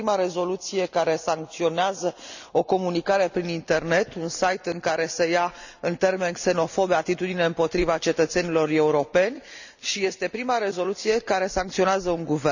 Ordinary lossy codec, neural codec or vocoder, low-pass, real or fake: none; none; 7.2 kHz; real